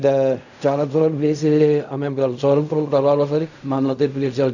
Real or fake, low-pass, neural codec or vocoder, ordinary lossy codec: fake; 7.2 kHz; codec, 16 kHz in and 24 kHz out, 0.4 kbps, LongCat-Audio-Codec, fine tuned four codebook decoder; none